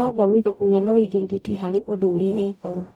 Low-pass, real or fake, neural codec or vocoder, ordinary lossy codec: 19.8 kHz; fake; codec, 44.1 kHz, 0.9 kbps, DAC; none